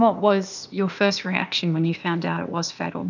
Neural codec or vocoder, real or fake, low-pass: codec, 16 kHz, 0.8 kbps, ZipCodec; fake; 7.2 kHz